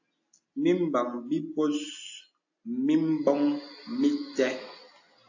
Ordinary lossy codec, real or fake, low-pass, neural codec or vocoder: AAC, 48 kbps; real; 7.2 kHz; none